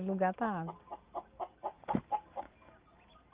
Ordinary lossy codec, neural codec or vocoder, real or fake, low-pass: Opus, 32 kbps; none; real; 3.6 kHz